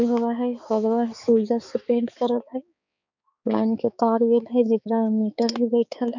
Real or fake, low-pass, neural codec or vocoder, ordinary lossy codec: fake; 7.2 kHz; codec, 16 kHz, 4 kbps, X-Codec, HuBERT features, trained on balanced general audio; none